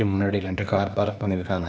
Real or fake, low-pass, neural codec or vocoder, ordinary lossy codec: fake; none; codec, 16 kHz, 0.8 kbps, ZipCodec; none